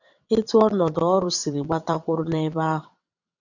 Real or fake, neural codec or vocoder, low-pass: fake; vocoder, 22.05 kHz, 80 mel bands, WaveNeXt; 7.2 kHz